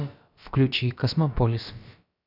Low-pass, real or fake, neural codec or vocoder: 5.4 kHz; fake; codec, 16 kHz, about 1 kbps, DyCAST, with the encoder's durations